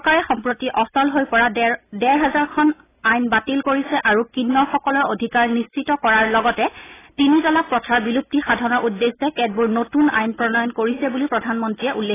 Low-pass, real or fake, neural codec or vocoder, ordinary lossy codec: 3.6 kHz; real; none; AAC, 16 kbps